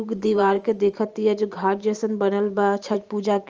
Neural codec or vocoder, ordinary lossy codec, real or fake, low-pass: vocoder, 44.1 kHz, 128 mel bands every 512 samples, BigVGAN v2; Opus, 24 kbps; fake; 7.2 kHz